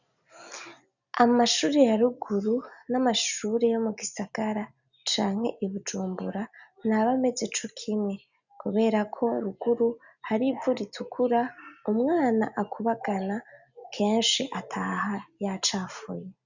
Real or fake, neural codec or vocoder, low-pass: real; none; 7.2 kHz